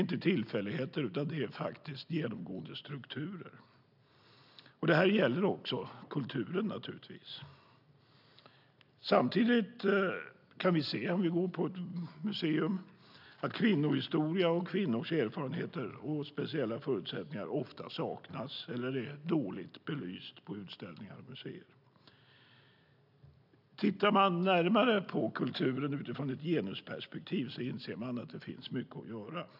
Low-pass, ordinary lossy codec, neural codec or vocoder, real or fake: 5.4 kHz; none; none; real